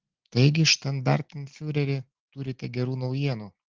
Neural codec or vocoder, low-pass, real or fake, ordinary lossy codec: none; 7.2 kHz; real; Opus, 16 kbps